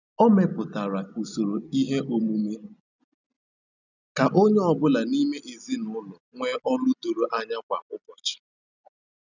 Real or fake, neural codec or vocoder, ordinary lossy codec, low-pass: real; none; none; 7.2 kHz